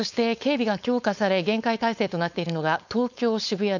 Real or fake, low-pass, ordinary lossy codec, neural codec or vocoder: fake; 7.2 kHz; none; codec, 16 kHz, 4.8 kbps, FACodec